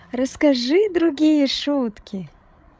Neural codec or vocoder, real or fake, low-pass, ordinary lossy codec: codec, 16 kHz, 16 kbps, FreqCodec, larger model; fake; none; none